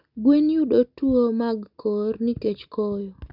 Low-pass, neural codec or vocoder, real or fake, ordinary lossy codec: 5.4 kHz; none; real; none